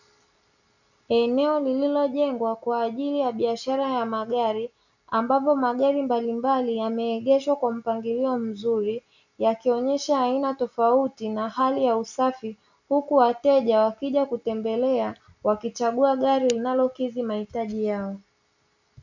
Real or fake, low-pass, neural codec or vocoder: real; 7.2 kHz; none